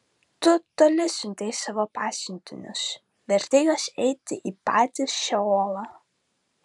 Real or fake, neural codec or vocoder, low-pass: fake; vocoder, 44.1 kHz, 128 mel bands every 512 samples, BigVGAN v2; 10.8 kHz